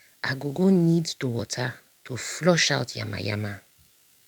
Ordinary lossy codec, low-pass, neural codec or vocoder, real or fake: none; 19.8 kHz; codec, 44.1 kHz, 7.8 kbps, DAC; fake